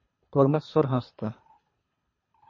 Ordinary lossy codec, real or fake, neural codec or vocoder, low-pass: MP3, 32 kbps; fake; codec, 24 kHz, 3 kbps, HILCodec; 7.2 kHz